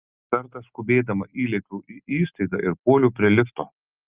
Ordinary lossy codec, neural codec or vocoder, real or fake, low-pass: Opus, 24 kbps; none; real; 3.6 kHz